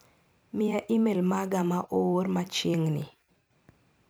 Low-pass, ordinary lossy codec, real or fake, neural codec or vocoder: none; none; fake; vocoder, 44.1 kHz, 128 mel bands every 256 samples, BigVGAN v2